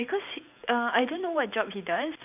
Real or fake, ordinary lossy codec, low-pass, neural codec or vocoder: fake; none; 3.6 kHz; vocoder, 44.1 kHz, 128 mel bands every 512 samples, BigVGAN v2